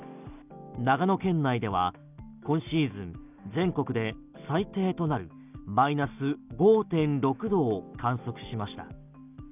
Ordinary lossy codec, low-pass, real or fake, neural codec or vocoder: none; 3.6 kHz; real; none